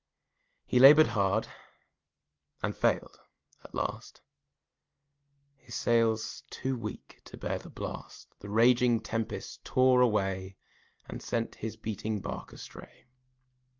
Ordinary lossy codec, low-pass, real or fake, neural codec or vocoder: Opus, 32 kbps; 7.2 kHz; real; none